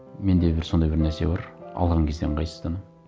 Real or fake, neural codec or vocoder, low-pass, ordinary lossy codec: real; none; none; none